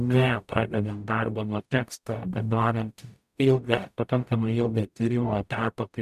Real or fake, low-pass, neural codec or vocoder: fake; 14.4 kHz; codec, 44.1 kHz, 0.9 kbps, DAC